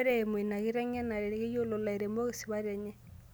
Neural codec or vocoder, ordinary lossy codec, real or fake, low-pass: none; none; real; none